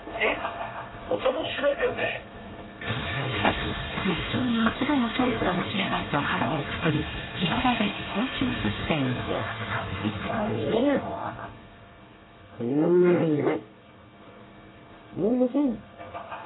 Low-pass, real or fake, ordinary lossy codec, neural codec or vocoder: 7.2 kHz; fake; AAC, 16 kbps; codec, 24 kHz, 1 kbps, SNAC